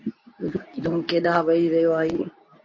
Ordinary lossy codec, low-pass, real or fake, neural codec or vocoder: MP3, 32 kbps; 7.2 kHz; fake; codec, 24 kHz, 0.9 kbps, WavTokenizer, medium speech release version 2